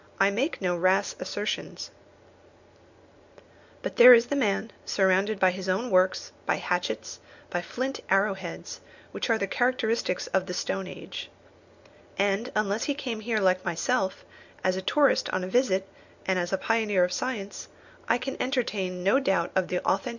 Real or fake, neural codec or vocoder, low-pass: real; none; 7.2 kHz